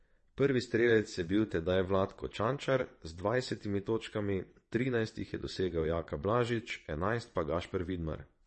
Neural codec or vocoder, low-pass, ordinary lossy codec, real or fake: vocoder, 22.05 kHz, 80 mel bands, WaveNeXt; 9.9 kHz; MP3, 32 kbps; fake